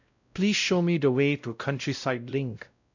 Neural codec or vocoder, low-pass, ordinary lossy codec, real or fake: codec, 16 kHz, 0.5 kbps, X-Codec, WavLM features, trained on Multilingual LibriSpeech; 7.2 kHz; none; fake